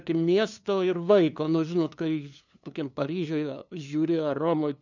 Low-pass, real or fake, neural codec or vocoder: 7.2 kHz; fake; codec, 16 kHz, 2 kbps, FunCodec, trained on LibriTTS, 25 frames a second